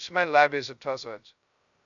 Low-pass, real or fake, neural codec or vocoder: 7.2 kHz; fake; codec, 16 kHz, 0.2 kbps, FocalCodec